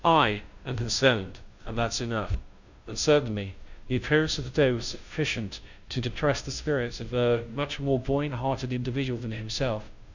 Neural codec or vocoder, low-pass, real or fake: codec, 16 kHz, 0.5 kbps, FunCodec, trained on Chinese and English, 25 frames a second; 7.2 kHz; fake